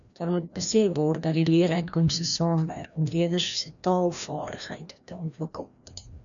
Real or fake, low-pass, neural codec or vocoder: fake; 7.2 kHz; codec, 16 kHz, 1 kbps, FreqCodec, larger model